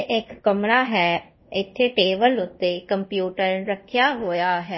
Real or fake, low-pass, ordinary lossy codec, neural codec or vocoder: fake; 7.2 kHz; MP3, 24 kbps; codec, 24 kHz, 0.5 kbps, DualCodec